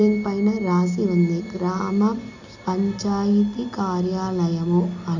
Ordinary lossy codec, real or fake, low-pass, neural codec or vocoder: none; real; 7.2 kHz; none